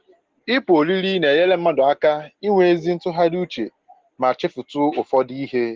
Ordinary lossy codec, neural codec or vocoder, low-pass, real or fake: Opus, 16 kbps; none; 7.2 kHz; real